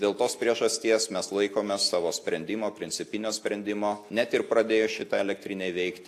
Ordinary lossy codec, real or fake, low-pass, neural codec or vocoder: AAC, 64 kbps; real; 14.4 kHz; none